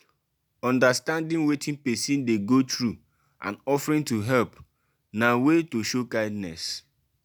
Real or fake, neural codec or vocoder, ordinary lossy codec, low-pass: real; none; none; none